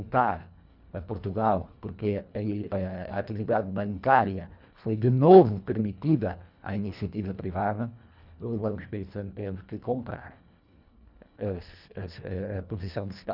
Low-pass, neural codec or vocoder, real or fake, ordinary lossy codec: 5.4 kHz; codec, 24 kHz, 1.5 kbps, HILCodec; fake; none